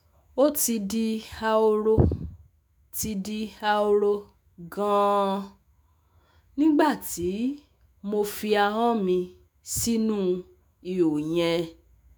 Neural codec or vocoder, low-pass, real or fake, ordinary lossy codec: autoencoder, 48 kHz, 128 numbers a frame, DAC-VAE, trained on Japanese speech; none; fake; none